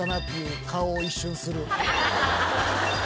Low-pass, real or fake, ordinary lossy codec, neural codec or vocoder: none; real; none; none